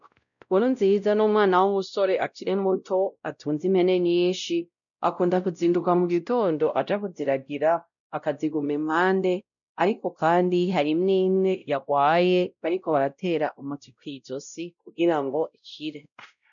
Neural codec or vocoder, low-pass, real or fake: codec, 16 kHz, 0.5 kbps, X-Codec, WavLM features, trained on Multilingual LibriSpeech; 7.2 kHz; fake